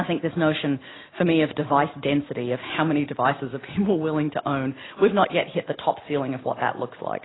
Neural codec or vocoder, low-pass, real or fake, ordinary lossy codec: none; 7.2 kHz; real; AAC, 16 kbps